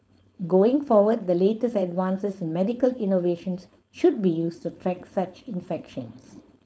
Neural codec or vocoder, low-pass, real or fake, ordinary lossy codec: codec, 16 kHz, 4.8 kbps, FACodec; none; fake; none